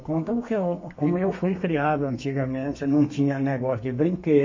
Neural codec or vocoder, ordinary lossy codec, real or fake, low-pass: codec, 32 kHz, 1.9 kbps, SNAC; MP3, 32 kbps; fake; 7.2 kHz